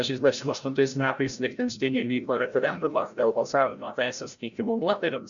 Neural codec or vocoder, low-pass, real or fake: codec, 16 kHz, 0.5 kbps, FreqCodec, larger model; 7.2 kHz; fake